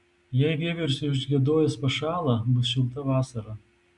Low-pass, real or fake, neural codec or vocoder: 10.8 kHz; real; none